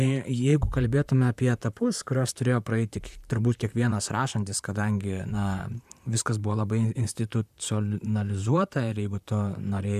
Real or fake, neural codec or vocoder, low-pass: fake; vocoder, 44.1 kHz, 128 mel bands, Pupu-Vocoder; 14.4 kHz